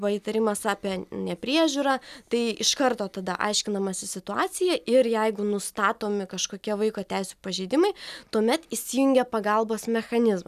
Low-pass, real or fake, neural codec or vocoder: 14.4 kHz; real; none